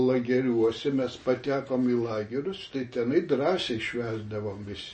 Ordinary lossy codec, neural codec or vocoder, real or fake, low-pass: MP3, 32 kbps; none; real; 9.9 kHz